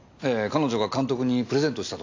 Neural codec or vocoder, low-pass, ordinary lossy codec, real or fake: none; 7.2 kHz; AAC, 48 kbps; real